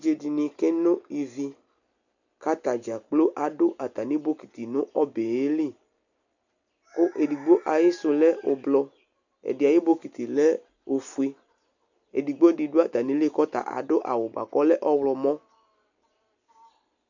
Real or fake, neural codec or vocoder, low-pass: real; none; 7.2 kHz